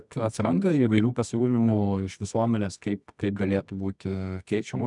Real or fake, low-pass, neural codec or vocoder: fake; 10.8 kHz; codec, 24 kHz, 0.9 kbps, WavTokenizer, medium music audio release